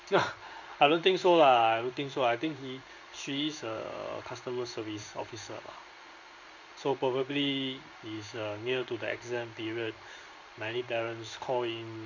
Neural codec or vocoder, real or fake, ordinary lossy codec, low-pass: codec, 16 kHz in and 24 kHz out, 1 kbps, XY-Tokenizer; fake; none; 7.2 kHz